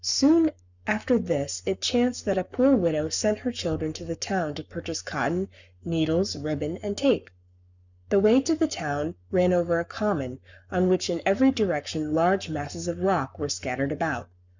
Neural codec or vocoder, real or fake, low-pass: codec, 44.1 kHz, 7.8 kbps, Pupu-Codec; fake; 7.2 kHz